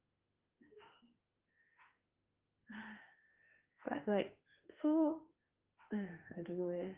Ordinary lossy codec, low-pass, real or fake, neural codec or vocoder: Opus, 16 kbps; 3.6 kHz; fake; autoencoder, 48 kHz, 32 numbers a frame, DAC-VAE, trained on Japanese speech